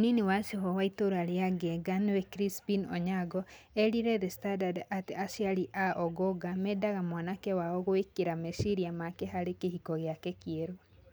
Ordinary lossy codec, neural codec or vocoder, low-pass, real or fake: none; none; none; real